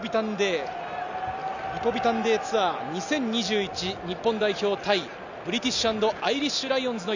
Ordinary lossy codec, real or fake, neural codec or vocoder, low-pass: none; real; none; 7.2 kHz